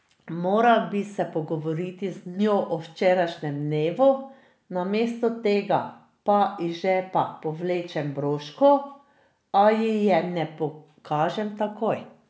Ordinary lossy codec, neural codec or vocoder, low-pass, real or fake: none; none; none; real